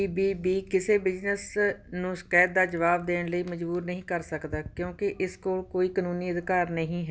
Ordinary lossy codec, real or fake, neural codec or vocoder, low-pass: none; real; none; none